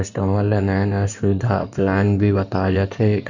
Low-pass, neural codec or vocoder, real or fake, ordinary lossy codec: 7.2 kHz; codec, 16 kHz in and 24 kHz out, 2.2 kbps, FireRedTTS-2 codec; fake; none